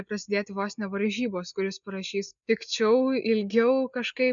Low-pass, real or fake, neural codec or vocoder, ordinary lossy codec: 7.2 kHz; real; none; MP3, 96 kbps